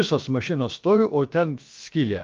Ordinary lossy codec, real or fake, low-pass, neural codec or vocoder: Opus, 24 kbps; fake; 7.2 kHz; codec, 16 kHz, about 1 kbps, DyCAST, with the encoder's durations